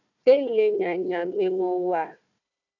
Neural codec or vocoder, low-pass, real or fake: codec, 16 kHz, 1 kbps, FunCodec, trained on Chinese and English, 50 frames a second; 7.2 kHz; fake